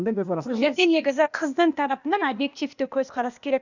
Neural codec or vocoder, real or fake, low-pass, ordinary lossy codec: codec, 16 kHz, 0.8 kbps, ZipCodec; fake; 7.2 kHz; none